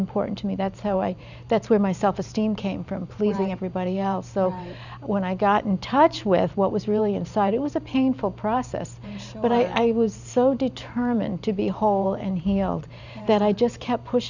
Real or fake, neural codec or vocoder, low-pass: fake; vocoder, 44.1 kHz, 128 mel bands every 512 samples, BigVGAN v2; 7.2 kHz